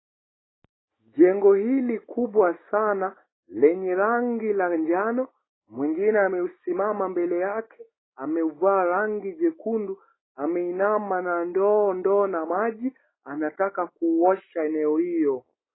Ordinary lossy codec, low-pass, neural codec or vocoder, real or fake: AAC, 16 kbps; 7.2 kHz; none; real